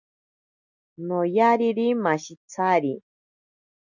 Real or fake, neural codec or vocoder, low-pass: real; none; 7.2 kHz